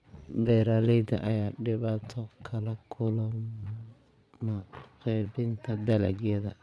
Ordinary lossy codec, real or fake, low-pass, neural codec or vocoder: none; fake; none; vocoder, 22.05 kHz, 80 mel bands, Vocos